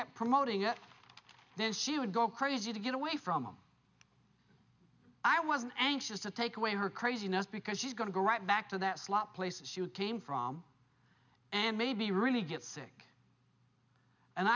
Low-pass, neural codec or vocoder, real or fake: 7.2 kHz; none; real